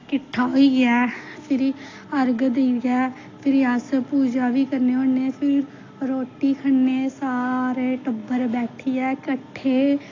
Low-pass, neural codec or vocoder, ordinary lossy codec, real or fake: 7.2 kHz; none; AAC, 32 kbps; real